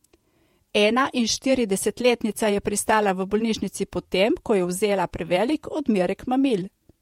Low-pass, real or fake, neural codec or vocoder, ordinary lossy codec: 19.8 kHz; fake; vocoder, 48 kHz, 128 mel bands, Vocos; MP3, 64 kbps